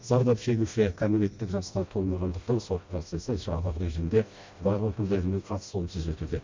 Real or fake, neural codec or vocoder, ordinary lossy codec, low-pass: fake; codec, 16 kHz, 1 kbps, FreqCodec, smaller model; MP3, 48 kbps; 7.2 kHz